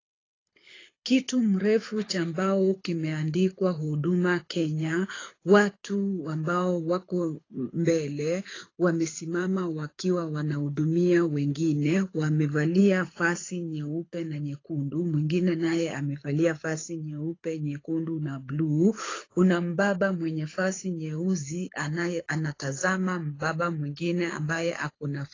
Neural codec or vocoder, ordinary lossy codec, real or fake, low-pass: codec, 24 kHz, 6 kbps, HILCodec; AAC, 32 kbps; fake; 7.2 kHz